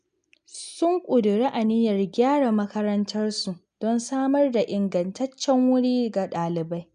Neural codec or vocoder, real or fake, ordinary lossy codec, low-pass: none; real; none; 9.9 kHz